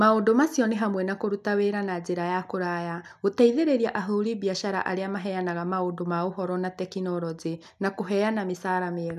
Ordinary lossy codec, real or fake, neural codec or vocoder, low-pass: none; real; none; 14.4 kHz